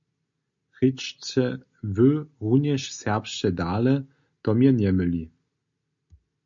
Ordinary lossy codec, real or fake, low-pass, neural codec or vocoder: MP3, 48 kbps; real; 7.2 kHz; none